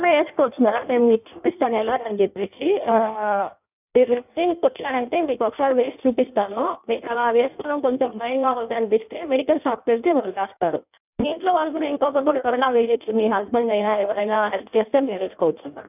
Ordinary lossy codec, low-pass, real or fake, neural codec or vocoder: none; 3.6 kHz; fake; codec, 16 kHz in and 24 kHz out, 1.1 kbps, FireRedTTS-2 codec